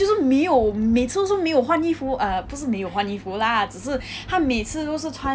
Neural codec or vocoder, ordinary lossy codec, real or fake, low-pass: none; none; real; none